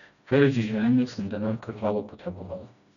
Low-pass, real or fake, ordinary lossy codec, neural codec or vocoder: 7.2 kHz; fake; none; codec, 16 kHz, 1 kbps, FreqCodec, smaller model